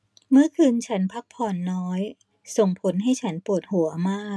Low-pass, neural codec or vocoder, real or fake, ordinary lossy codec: none; none; real; none